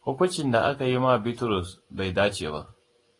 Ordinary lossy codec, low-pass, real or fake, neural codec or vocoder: AAC, 32 kbps; 10.8 kHz; real; none